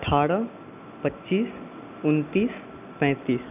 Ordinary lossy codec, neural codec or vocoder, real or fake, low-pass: none; none; real; 3.6 kHz